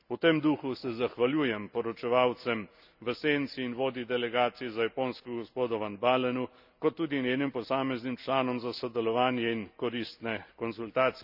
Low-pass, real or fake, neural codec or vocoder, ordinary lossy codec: 5.4 kHz; real; none; none